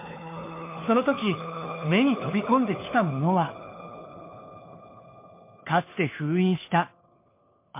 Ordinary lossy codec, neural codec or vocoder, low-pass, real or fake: MP3, 24 kbps; codec, 16 kHz, 4 kbps, FunCodec, trained on LibriTTS, 50 frames a second; 3.6 kHz; fake